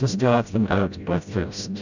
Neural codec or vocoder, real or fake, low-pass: codec, 16 kHz, 0.5 kbps, FreqCodec, smaller model; fake; 7.2 kHz